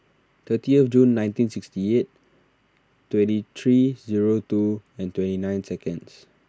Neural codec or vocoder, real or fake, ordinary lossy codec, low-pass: none; real; none; none